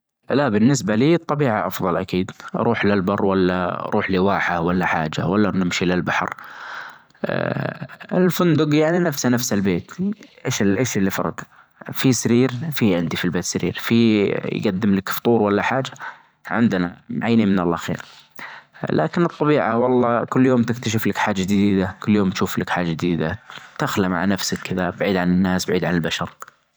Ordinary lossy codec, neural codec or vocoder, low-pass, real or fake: none; vocoder, 44.1 kHz, 128 mel bands every 512 samples, BigVGAN v2; none; fake